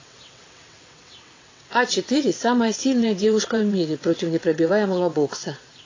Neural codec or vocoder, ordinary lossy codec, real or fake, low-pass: vocoder, 22.05 kHz, 80 mel bands, WaveNeXt; AAC, 32 kbps; fake; 7.2 kHz